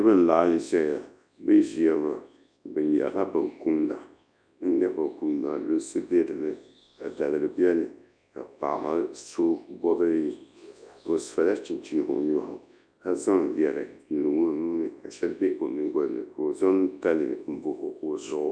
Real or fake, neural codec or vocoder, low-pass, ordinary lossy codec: fake; codec, 24 kHz, 0.9 kbps, WavTokenizer, large speech release; 9.9 kHz; MP3, 96 kbps